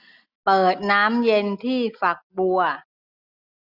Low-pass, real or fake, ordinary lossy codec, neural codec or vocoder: 5.4 kHz; real; none; none